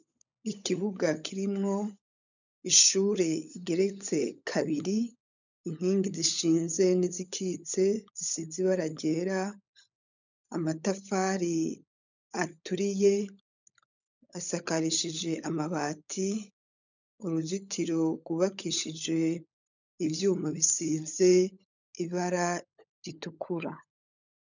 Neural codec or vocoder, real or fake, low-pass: codec, 16 kHz, 16 kbps, FunCodec, trained on LibriTTS, 50 frames a second; fake; 7.2 kHz